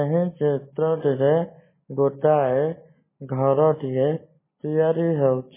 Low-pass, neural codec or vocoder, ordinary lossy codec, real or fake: 3.6 kHz; none; MP3, 16 kbps; real